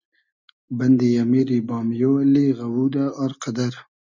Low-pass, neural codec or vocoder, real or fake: 7.2 kHz; none; real